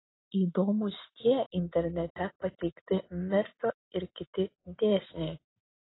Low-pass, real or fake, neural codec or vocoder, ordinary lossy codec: 7.2 kHz; real; none; AAC, 16 kbps